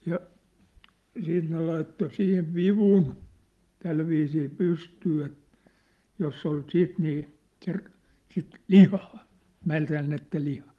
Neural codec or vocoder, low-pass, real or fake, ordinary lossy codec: none; 14.4 kHz; real; Opus, 24 kbps